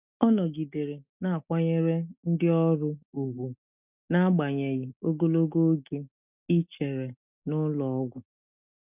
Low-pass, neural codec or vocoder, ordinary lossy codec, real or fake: 3.6 kHz; none; none; real